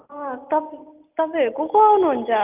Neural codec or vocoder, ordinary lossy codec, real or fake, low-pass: none; Opus, 24 kbps; real; 3.6 kHz